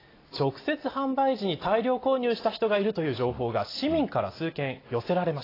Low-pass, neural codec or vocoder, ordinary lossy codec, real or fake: 5.4 kHz; none; AAC, 24 kbps; real